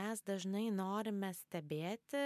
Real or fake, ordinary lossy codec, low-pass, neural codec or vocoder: real; MP3, 96 kbps; 14.4 kHz; none